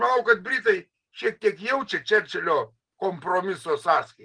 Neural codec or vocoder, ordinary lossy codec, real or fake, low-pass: none; Opus, 24 kbps; real; 9.9 kHz